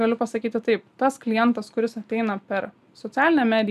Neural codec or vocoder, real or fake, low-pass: none; real; 14.4 kHz